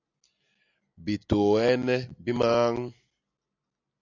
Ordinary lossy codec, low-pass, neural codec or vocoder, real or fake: AAC, 48 kbps; 7.2 kHz; none; real